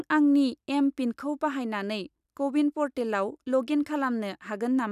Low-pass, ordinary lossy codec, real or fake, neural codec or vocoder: 14.4 kHz; none; real; none